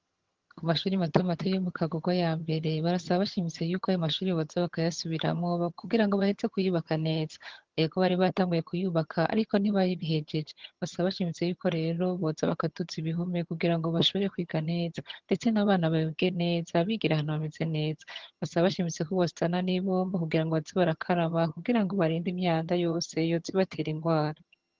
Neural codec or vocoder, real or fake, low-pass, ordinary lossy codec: vocoder, 22.05 kHz, 80 mel bands, HiFi-GAN; fake; 7.2 kHz; Opus, 16 kbps